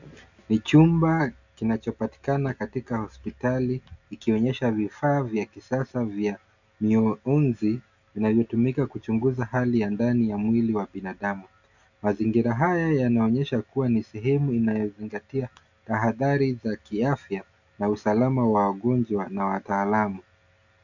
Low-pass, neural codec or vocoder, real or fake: 7.2 kHz; none; real